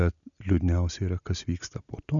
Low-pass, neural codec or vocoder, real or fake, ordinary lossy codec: 7.2 kHz; none; real; MP3, 96 kbps